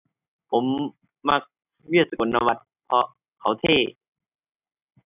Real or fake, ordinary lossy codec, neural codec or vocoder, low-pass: real; none; none; 3.6 kHz